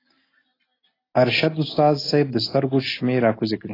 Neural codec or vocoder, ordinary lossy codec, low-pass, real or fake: none; AAC, 24 kbps; 5.4 kHz; real